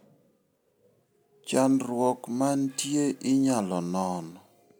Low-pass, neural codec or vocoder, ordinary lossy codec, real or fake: none; none; none; real